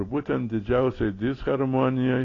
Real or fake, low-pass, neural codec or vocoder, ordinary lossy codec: real; 7.2 kHz; none; AAC, 32 kbps